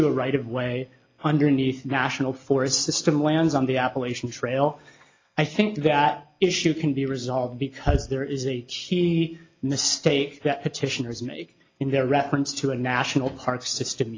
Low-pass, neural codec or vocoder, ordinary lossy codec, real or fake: 7.2 kHz; none; AAC, 32 kbps; real